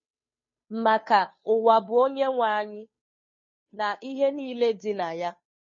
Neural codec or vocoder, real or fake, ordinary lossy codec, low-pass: codec, 16 kHz, 2 kbps, FunCodec, trained on Chinese and English, 25 frames a second; fake; MP3, 32 kbps; 7.2 kHz